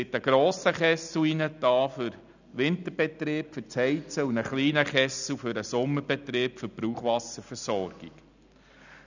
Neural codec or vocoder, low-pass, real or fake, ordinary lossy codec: none; 7.2 kHz; real; none